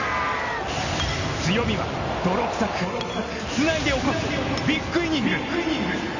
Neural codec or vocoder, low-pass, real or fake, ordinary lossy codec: none; 7.2 kHz; real; none